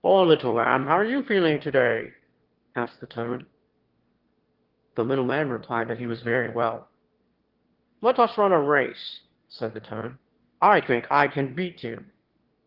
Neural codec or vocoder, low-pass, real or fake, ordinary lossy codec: autoencoder, 22.05 kHz, a latent of 192 numbers a frame, VITS, trained on one speaker; 5.4 kHz; fake; Opus, 16 kbps